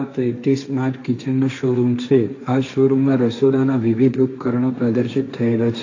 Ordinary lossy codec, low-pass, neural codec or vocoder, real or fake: AAC, 48 kbps; 7.2 kHz; codec, 16 kHz, 1.1 kbps, Voila-Tokenizer; fake